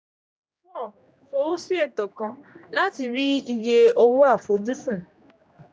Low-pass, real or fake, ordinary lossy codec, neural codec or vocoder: none; fake; none; codec, 16 kHz, 1 kbps, X-Codec, HuBERT features, trained on general audio